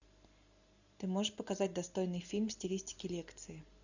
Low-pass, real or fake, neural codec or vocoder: 7.2 kHz; real; none